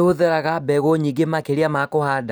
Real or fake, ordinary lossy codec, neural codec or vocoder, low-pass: real; none; none; none